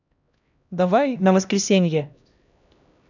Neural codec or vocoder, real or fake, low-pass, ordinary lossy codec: codec, 16 kHz, 1 kbps, X-Codec, HuBERT features, trained on LibriSpeech; fake; 7.2 kHz; none